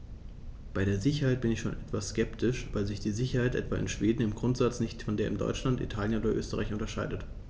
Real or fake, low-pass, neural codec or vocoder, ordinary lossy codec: real; none; none; none